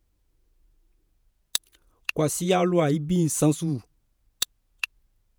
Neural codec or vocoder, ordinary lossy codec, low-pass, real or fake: vocoder, 48 kHz, 128 mel bands, Vocos; none; none; fake